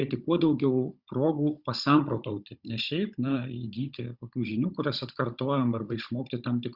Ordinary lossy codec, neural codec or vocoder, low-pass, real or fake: Opus, 64 kbps; codec, 16 kHz, 16 kbps, FunCodec, trained on Chinese and English, 50 frames a second; 5.4 kHz; fake